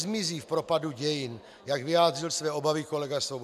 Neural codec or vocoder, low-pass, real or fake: none; 14.4 kHz; real